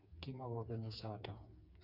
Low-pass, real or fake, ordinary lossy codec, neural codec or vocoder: 5.4 kHz; fake; none; codec, 16 kHz, 4 kbps, FreqCodec, smaller model